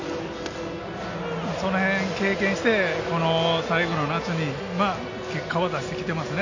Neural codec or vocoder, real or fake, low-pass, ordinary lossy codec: none; real; 7.2 kHz; AAC, 48 kbps